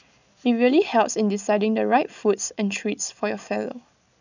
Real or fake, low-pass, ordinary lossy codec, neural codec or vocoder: real; 7.2 kHz; none; none